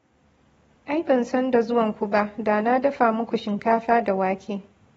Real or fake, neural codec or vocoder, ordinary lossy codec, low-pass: real; none; AAC, 24 kbps; 19.8 kHz